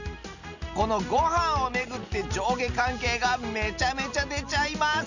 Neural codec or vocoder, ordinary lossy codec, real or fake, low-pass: none; none; real; 7.2 kHz